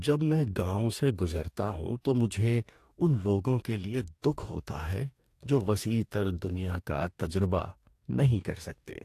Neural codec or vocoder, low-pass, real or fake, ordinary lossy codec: codec, 44.1 kHz, 2.6 kbps, DAC; 14.4 kHz; fake; AAC, 64 kbps